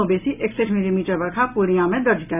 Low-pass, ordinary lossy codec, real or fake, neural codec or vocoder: 3.6 kHz; none; real; none